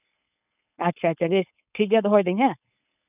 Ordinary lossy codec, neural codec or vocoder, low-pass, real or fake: none; codec, 16 kHz, 4.8 kbps, FACodec; 3.6 kHz; fake